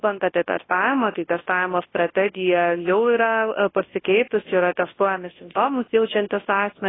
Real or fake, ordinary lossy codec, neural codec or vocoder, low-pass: fake; AAC, 16 kbps; codec, 24 kHz, 0.9 kbps, WavTokenizer, large speech release; 7.2 kHz